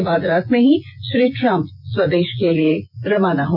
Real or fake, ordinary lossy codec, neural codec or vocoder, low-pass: fake; none; vocoder, 24 kHz, 100 mel bands, Vocos; 5.4 kHz